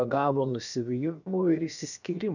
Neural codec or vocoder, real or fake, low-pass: codec, 16 kHz, about 1 kbps, DyCAST, with the encoder's durations; fake; 7.2 kHz